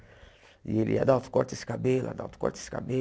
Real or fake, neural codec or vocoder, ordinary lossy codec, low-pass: real; none; none; none